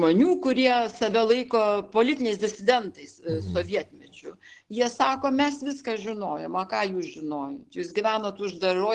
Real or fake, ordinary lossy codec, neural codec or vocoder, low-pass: real; Opus, 16 kbps; none; 10.8 kHz